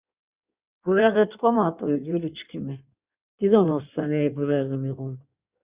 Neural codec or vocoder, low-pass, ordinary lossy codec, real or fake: codec, 16 kHz in and 24 kHz out, 1.1 kbps, FireRedTTS-2 codec; 3.6 kHz; Opus, 64 kbps; fake